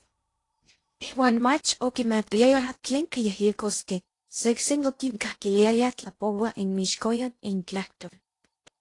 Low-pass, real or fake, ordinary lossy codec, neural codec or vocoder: 10.8 kHz; fake; AAC, 48 kbps; codec, 16 kHz in and 24 kHz out, 0.6 kbps, FocalCodec, streaming, 2048 codes